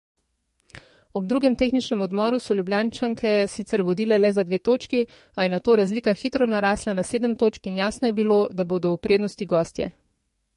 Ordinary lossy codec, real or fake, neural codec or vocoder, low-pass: MP3, 48 kbps; fake; codec, 44.1 kHz, 2.6 kbps, SNAC; 14.4 kHz